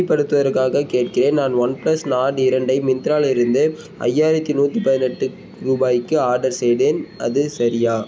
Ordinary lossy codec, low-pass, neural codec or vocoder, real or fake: none; none; none; real